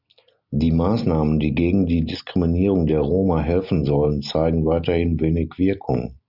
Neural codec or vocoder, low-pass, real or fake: none; 5.4 kHz; real